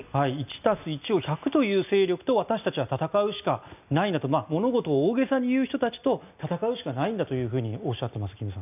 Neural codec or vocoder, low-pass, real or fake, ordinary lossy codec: none; 3.6 kHz; real; none